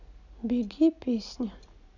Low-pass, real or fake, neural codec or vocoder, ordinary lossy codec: 7.2 kHz; real; none; none